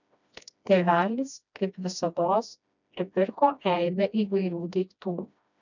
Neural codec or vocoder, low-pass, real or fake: codec, 16 kHz, 1 kbps, FreqCodec, smaller model; 7.2 kHz; fake